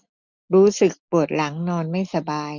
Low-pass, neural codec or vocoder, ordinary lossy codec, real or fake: 7.2 kHz; none; none; real